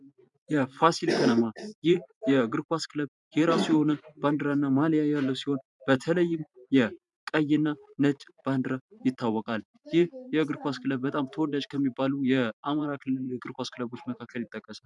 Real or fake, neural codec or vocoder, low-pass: fake; vocoder, 44.1 kHz, 128 mel bands every 512 samples, BigVGAN v2; 10.8 kHz